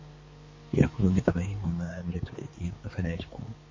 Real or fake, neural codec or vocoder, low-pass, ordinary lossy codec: fake; codec, 16 kHz, 4 kbps, X-Codec, HuBERT features, trained on balanced general audio; 7.2 kHz; MP3, 32 kbps